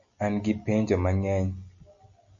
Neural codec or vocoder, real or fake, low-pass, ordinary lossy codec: none; real; 7.2 kHz; Opus, 64 kbps